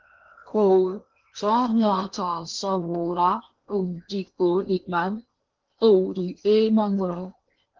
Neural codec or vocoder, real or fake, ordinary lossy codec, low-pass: codec, 16 kHz in and 24 kHz out, 0.8 kbps, FocalCodec, streaming, 65536 codes; fake; Opus, 32 kbps; 7.2 kHz